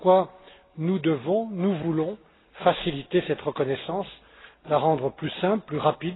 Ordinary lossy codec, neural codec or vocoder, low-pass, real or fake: AAC, 16 kbps; none; 7.2 kHz; real